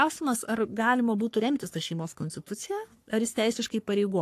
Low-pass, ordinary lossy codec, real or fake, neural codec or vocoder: 14.4 kHz; AAC, 64 kbps; fake; codec, 44.1 kHz, 3.4 kbps, Pupu-Codec